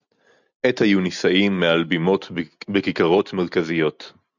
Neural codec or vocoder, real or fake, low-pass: none; real; 7.2 kHz